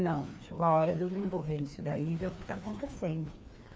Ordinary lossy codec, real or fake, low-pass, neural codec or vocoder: none; fake; none; codec, 16 kHz, 2 kbps, FreqCodec, larger model